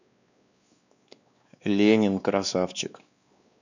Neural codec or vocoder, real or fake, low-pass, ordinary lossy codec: codec, 16 kHz, 2 kbps, X-Codec, WavLM features, trained on Multilingual LibriSpeech; fake; 7.2 kHz; none